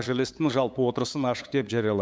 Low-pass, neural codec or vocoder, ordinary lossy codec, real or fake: none; codec, 16 kHz, 8 kbps, FunCodec, trained on LibriTTS, 25 frames a second; none; fake